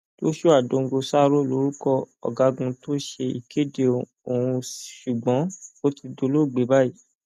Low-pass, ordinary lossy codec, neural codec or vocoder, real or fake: 14.4 kHz; none; none; real